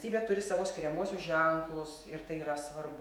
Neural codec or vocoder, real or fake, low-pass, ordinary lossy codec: autoencoder, 48 kHz, 128 numbers a frame, DAC-VAE, trained on Japanese speech; fake; 19.8 kHz; MP3, 96 kbps